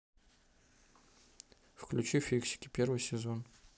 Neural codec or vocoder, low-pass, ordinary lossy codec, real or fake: none; none; none; real